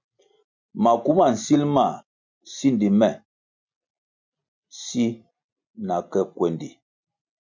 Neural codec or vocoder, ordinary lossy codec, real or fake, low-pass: none; MP3, 64 kbps; real; 7.2 kHz